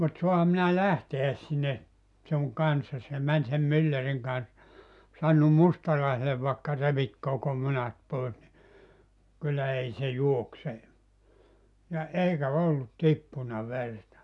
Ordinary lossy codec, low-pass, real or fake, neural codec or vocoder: none; 10.8 kHz; real; none